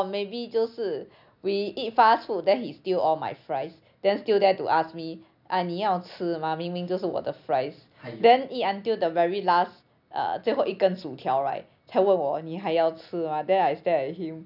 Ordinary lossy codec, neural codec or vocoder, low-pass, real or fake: none; none; 5.4 kHz; real